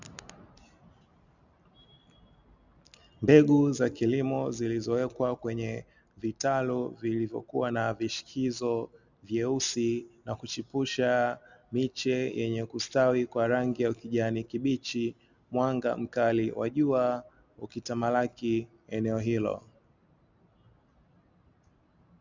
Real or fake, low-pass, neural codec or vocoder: real; 7.2 kHz; none